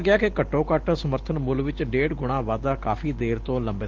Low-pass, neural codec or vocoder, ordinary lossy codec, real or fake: 7.2 kHz; none; Opus, 16 kbps; real